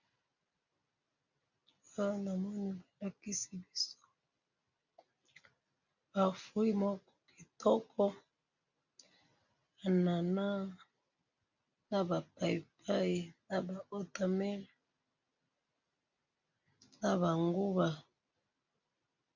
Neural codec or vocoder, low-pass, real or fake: none; 7.2 kHz; real